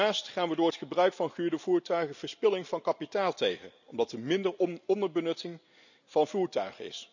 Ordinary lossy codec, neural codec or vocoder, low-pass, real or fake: none; none; 7.2 kHz; real